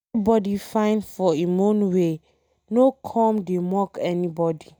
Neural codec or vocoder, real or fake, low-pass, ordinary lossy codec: none; real; none; none